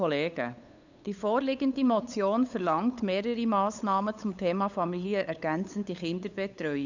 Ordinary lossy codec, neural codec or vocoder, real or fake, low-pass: none; codec, 16 kHz, 8 kbps, FunCodec, trained on LibriTTS, 25 frames a second; fake; 7.2 kHz